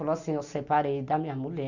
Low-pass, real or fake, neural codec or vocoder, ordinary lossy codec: 7.2 kHz; real; none; none